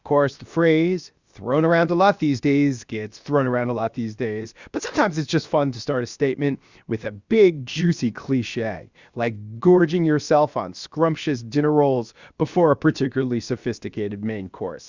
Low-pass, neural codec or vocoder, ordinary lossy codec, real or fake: 7.2 kHz; codec, 16 kHz, about 1 kbps, DyCAST, with the encoder's durations; Opus, 64 kbps; fake